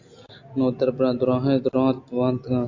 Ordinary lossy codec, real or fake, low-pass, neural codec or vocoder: AAC, 48 kbps; real; 7.2 kHz; none